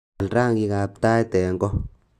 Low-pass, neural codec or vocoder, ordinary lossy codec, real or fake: 14.4 kHz; none; AAC, 96 kbps; real